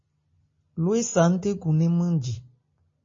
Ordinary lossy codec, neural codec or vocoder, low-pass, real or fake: MP3, 32 kbps; none; 7.2 kHz; real